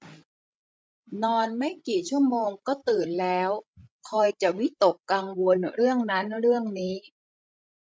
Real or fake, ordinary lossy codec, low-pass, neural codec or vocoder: fake; none; none; codec, 16 kHz, 16 kbps, FreqCodec, larger model